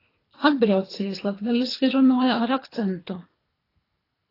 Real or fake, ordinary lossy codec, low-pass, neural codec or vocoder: fake; AAC, 24 kbps; 5.4 kHz; codec, 24 kHz, 3 kbps, HILCodec